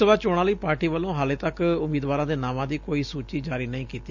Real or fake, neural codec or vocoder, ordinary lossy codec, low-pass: real; none; Opus, 64 kbps; 7.2 kHz